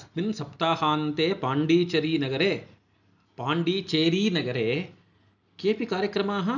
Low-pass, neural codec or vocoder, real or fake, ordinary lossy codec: 7.2 kHz; none; real; none